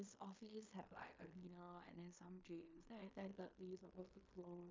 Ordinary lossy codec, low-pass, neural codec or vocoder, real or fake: MP3, 64 kbps; 7.2 kHz; codec, 16 kHz in and 24 kHz out, 0.9 kbps, LongCat-Audio-Codec, fine tuned four codebook decoder; fake